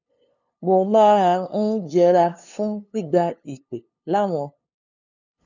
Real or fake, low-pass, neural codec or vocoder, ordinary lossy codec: fake; 7.2 kHz; codec, 16 kHz, 2 kbps, FunCodec, trained on LibriTTS, 25 frames a second; none